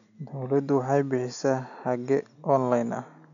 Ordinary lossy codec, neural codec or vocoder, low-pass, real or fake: none; none; 7.2 kHz; real